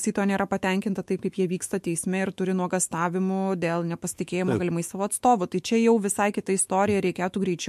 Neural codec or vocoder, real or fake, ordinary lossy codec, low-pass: autoencoder, 48 kHz, 128 numbers a frame, DAC-VAE, trained on Japanese speech; fake; MP3, 64 kbps; 14.4 kHz